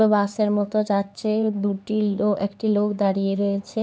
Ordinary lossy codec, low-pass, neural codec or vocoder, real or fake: none; none; codec, 16 kHz, 4 kbps, X-Codec, HuBERT features, trained on LibriSpeech; fake